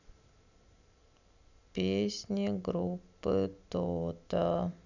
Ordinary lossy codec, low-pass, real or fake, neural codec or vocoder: none; 7.2 kHz; real; none